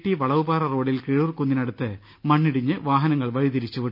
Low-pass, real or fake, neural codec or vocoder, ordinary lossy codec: 5.4 kHz; real; none; none